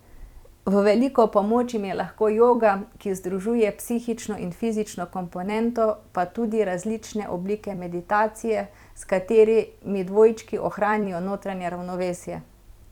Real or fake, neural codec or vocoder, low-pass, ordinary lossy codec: fake; vocoder, 44.1 kHz, 128 mel bands every 256 samples, BigVGAN v2; 19.8 kHz; none